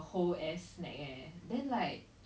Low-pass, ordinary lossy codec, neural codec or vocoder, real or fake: none; none; none; real